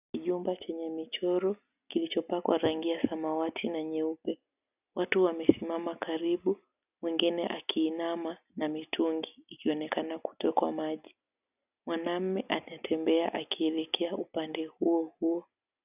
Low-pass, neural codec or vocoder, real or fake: 3.6 kHz; none; real